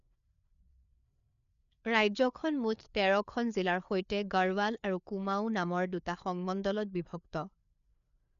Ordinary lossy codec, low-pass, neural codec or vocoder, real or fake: none; 7.2 kHz; codec, 16 kHz, 4 kbps, FreqCodec, larger model; fake